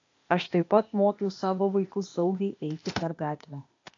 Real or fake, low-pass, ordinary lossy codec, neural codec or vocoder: fake; 7.2 kHz; AAC, 48 kbps; codec, 16 kHz, 0.8 kbps, ZipCodec